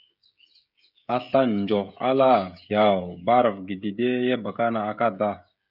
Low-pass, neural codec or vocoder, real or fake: 5.4 kHz; codec, 16 kHz, 16 kbps, FreqCodec, smaller model; fake